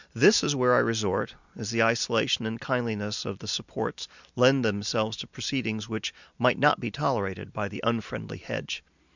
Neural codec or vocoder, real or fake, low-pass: none; real; 7.2 kHz